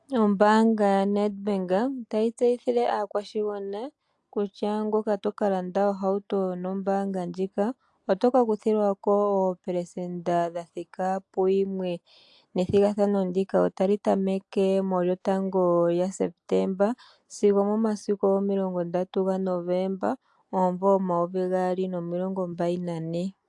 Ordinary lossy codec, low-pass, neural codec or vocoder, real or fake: AAC, 64 kbps; 10.8 kHz; none; real